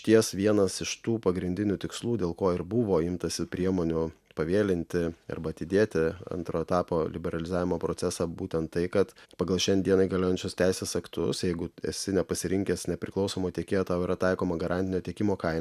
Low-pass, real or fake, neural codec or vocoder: 14.4 kHz; real; none